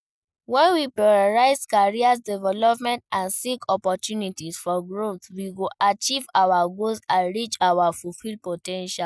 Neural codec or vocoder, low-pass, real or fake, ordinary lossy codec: none; none; real; none